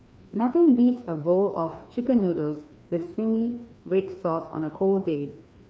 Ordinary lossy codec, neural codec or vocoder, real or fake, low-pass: none; codec, 16 kHz, 2 kbps, FreqCodec, larger model; fake; none